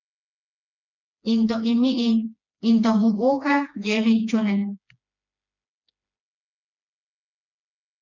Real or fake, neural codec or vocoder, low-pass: fake; codec, 16 kHz, 2 kbps, FreqCodec, smaller model; 7.2 kHz